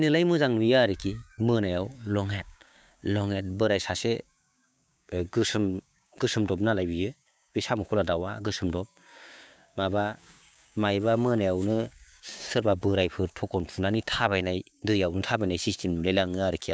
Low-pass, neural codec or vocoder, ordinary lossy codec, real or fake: none; codec, 16 kHz, 6 kbps, DAC; none; fake